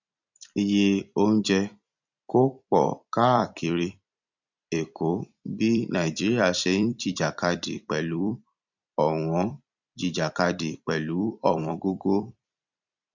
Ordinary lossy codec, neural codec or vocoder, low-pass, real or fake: none; vocoder, 44.1 kHz, 128 mel bands every 256 samples, BigVGAN v2; 7.2 kHz; fake